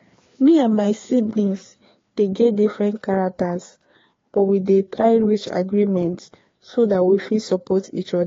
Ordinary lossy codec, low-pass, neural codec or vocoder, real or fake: AAC, 32 kbps; 7.2 kHz; codec, 16 kHz, 2 kbps, FreqCodec, larger model; fake